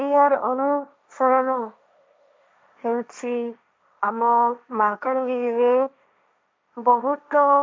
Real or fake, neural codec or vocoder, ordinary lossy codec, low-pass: fake; codec, 16 kHz, 1.1 kbps, Voila-Tokenizer; none; none